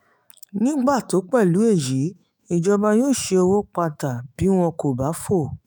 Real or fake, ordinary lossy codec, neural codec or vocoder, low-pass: fake; none; autoencoder, 48 kHz, 128 numbers a frame, DAC-VAE, trained on Japanese speech; none